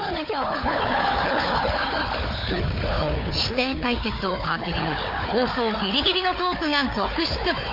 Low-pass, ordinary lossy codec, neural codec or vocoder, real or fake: 5.4 kHz; MP3, 32 kbps; codec, 16 kHz, 4 kbps, FunCodec, trained on Chinese and English, 50 frames a second; fake